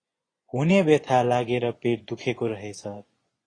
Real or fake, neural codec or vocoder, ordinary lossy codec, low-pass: fake; vocoder, 24 kHz, 100 mel bands, Vocos; AAC, 32 kbps; 9.9 kHz